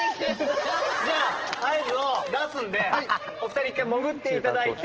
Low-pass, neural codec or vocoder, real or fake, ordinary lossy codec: 7.2 kHz; none; real; Opus, 16 kbps